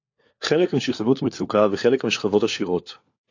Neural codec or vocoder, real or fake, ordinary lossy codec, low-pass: codec, 16 kHz, 4 kbps, FunCodec, trained on LibriTTS, 50 frames a second; fake; AAC, 48 kbps; 7.2 kHz